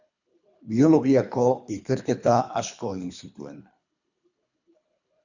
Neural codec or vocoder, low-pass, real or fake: codec, 24 kHz, 3 kbps, HILCodec; 7.2 kHz; fake